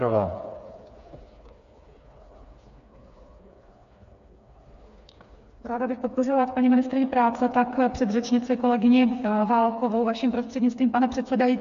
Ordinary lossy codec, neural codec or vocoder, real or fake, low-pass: MP3, 48 kbps; codec, 16 kHz, 4 kbps, FreqCodec, smaller model; fake; 7.2 kHz